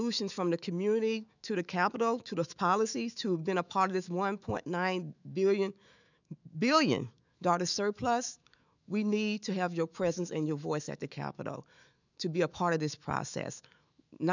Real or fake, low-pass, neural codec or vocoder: fake; 7.2 kHz; autoencoder, 48 kHz, 128 numbers a frame, DAC-VAE, trained on Japanese speech